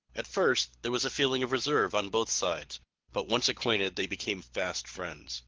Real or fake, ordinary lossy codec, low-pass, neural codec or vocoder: fake; Opus, 16 kbps; 7.2 kHz; codec, 24 kHz, 6 kbps, HILCodec